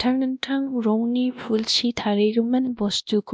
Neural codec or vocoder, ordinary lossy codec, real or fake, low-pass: codec, 16 kHz, 1 kbps, X-Codec, WavLM features, trained on Multilingual LibriSpeech; none; fake; none